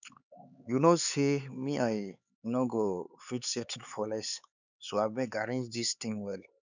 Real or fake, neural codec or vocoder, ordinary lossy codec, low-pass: fake; codec, 16 kHz, 4 kbps, X-Codec, HuBERT features, trained on LibriSpeech; none; 7.2 kHz